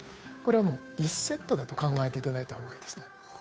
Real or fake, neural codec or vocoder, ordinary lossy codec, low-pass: fake; codec, 16 kHz, 2 kbps, FunCodec, trained on Chinese and English, 25 frames a second; none; none